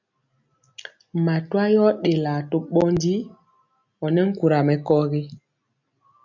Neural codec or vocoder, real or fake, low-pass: none; real; 7.2 kHz